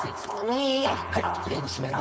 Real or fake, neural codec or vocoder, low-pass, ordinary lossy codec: fake; codec, 16 kHz, 4.8 kbps, FACodec; none; none